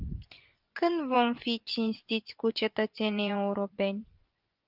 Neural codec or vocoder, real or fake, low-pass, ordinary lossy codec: vocoder, 24 kHz, 100 mel bands, Vocos; fake; 5.4 kHz; Opus, 24 kbps